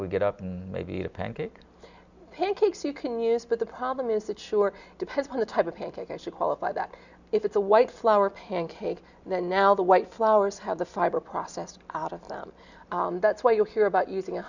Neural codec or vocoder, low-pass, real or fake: none; 7.2 kHz; real